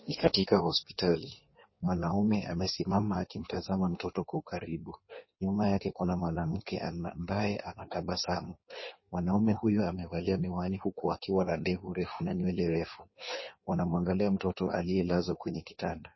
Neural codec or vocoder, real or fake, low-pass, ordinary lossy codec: codec, 16 kHz in and 24 kHz out, 1.1 kbps, FireRedTTS-2 codec; fake; 7.2 kHz; MP3, 24 kbps